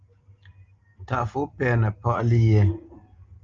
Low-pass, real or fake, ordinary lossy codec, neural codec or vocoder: 7.2 kHz; real; Opus, 24 kbps; none